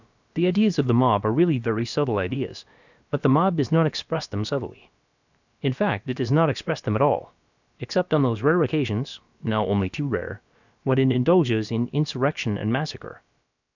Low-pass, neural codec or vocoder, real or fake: 7.2 kHz; codec, 16 kHz, about 1 kbps, DyCAST, with the encoder's durations; fake